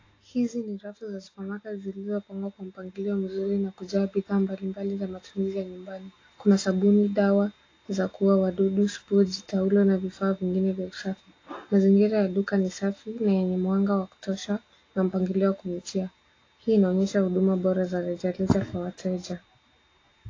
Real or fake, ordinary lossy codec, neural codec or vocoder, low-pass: real; AAC, 32 kbps; none; 7.2 kHz